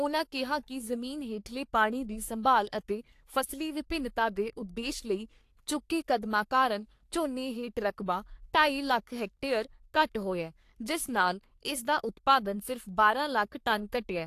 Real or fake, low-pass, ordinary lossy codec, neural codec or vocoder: fake; 14.4 kHz; AAC, 64 kbps; codec, 44.1 kHz, 3.4 kbps, Pupu-Codec